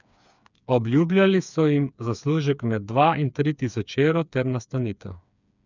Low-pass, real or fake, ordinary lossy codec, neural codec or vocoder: 7.2 kHz; fake; none; codec, 16 kHz, 4 kbps, FreqCodec, smaller model